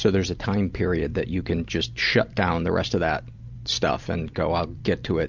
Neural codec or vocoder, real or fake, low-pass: none; real; 7.2 kHz